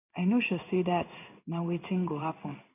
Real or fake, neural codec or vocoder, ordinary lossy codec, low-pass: fake; codec, 16 kHz in and 24 kHz out, 1 kbps, XY-Tokenizer; none; 3.6 kHz